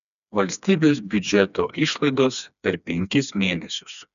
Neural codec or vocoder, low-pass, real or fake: codec, 16 kHz, 2 kbps, FreqCodec, smaller model; 7.2 kHz; fake